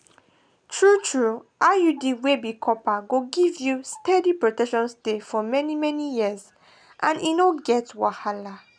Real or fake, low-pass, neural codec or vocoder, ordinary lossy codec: real; 9.9 kHz; none; none